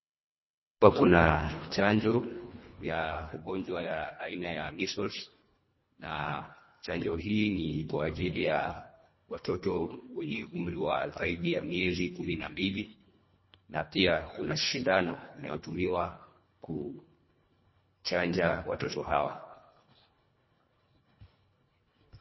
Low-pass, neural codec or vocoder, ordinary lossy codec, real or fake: 7.2 kHz; codec, 24 kHz, 1.5 kbps, HILCodec; MP3, 24 kbps; fake